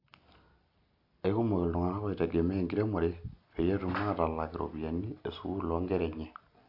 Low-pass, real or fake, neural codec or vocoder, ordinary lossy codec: 5.4 kHz; real; none; AAC, 48 kbps